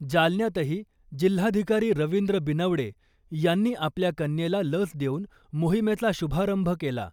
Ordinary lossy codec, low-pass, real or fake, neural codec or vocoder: none; 19.8 kHz; real; none